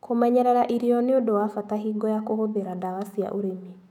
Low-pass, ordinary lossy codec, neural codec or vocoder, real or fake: 19.8 kHz; none; autoencoder, 48 kHz, 128 numbers a frame, DAC-VAE, trained on Japanese speech; fake